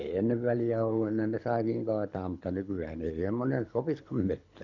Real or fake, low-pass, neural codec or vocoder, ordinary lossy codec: fake; 7.2 kHz; codec, 24 kHz, 6 kbps, HILCodec; none